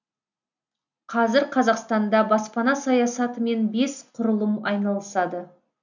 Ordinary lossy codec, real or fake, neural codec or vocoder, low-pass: none; real; none; 7.2 kHz